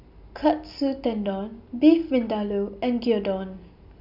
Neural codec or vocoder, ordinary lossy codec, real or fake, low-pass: none; none; real; 5.4 kHz